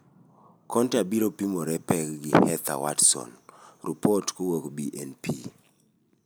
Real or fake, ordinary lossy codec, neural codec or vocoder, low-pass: real; none; none; none